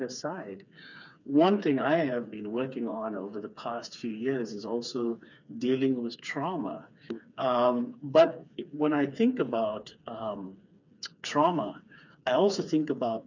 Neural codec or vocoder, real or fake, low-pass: codec, 16 kHz, 4 kbps, FreqCodec, smaller model; fake; 7.2 kHz